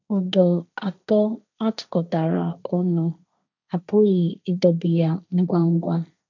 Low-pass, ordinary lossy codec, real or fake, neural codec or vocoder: 7.2 kHz; none; fake; codec, 16 kHz, 1.1 kbps, Voila-Tokenizer